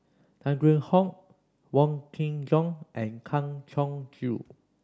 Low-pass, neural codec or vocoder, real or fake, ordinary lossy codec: none; none; real; none